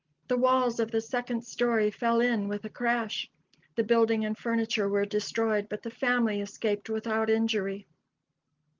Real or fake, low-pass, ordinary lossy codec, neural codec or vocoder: real; 7.2 kHz; Opus, 24 kbps; none